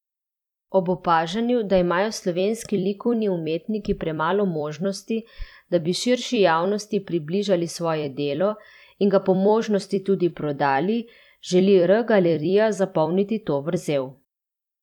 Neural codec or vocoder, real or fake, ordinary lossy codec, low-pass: vocoder, 44.1 kHz, 128 mel bands every 256 samples, BigVGAN v2; fake; none; 19.8 kHz